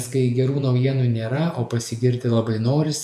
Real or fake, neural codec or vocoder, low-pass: fake; vocoder, 48 kHz, 128 mel bands, Vocos; 14.4 kHz